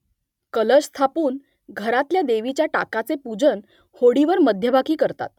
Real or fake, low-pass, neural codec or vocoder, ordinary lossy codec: real; 19.8 kHz; none; none